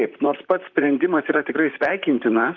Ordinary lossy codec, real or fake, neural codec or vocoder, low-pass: Opus, 24 kbps; fake; vocoder, 44.1 kHz, 128 mel bands, Pupu-Vocoder; 7.2 kHz